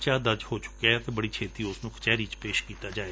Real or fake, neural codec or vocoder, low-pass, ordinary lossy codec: real; none; none; none